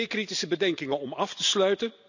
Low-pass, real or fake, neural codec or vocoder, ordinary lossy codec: 7.2 kHz; real; none; none